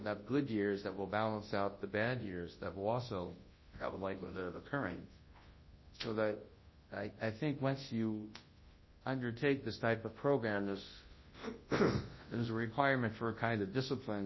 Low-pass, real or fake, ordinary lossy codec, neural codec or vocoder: 7.2 kHz; fake; MP3, 24 kbps; codec, 24 kHz, 0.9 kbps, WavTokenizer, large speech release